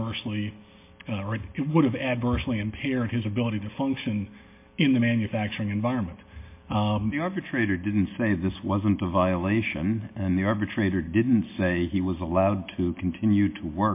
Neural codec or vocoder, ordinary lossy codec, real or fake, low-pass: none; MP3, 24 kbps; real; 3.6 kHz